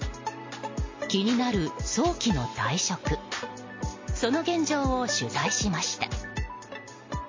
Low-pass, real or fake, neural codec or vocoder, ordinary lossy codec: 7.2 kHz; real; none; MP3, 32 kbps